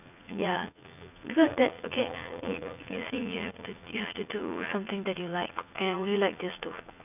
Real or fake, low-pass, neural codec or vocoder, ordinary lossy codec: fake; 3.6 kHz; vocoder, 44.1 kHz, 80 mel bands, Vocos; none